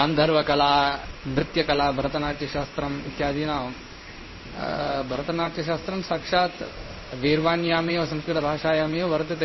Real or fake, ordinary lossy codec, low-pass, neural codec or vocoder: fake; MP3, 24 kbps; 7.2 kHz; codec, 16 kHz in and 24 kHz out, 1 kbps, XY-Tokenizer